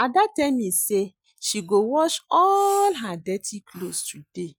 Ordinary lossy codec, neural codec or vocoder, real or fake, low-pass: none; none; real; none